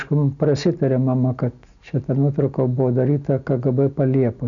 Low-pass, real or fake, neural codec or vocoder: 7.2 kHz; real; none